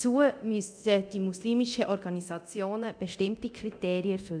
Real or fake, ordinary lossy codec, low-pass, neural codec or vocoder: fake; none; 9.9 kHz; codec, 24 kHz, 0.9 kbps, DualCodec